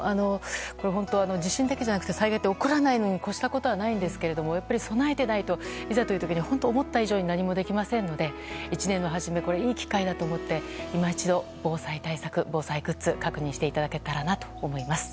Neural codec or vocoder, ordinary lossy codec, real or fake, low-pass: none; none; real; none